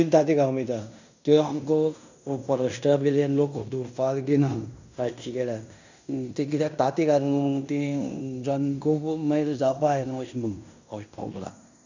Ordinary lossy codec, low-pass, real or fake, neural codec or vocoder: none; 7.2 kHz; fake; codec, 16 kHz in and 24 kHz out, 0.9 kbps, LongCat-Audio-Codec, fine tuned four codebook decoder